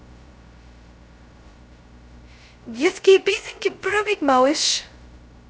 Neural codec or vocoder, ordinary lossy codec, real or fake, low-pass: codec, 16 kHz, 0.2 kbps, FocalCodec; none; fake; none